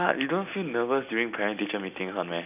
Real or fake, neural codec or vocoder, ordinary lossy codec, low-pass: real; none; none; 3.6 kHz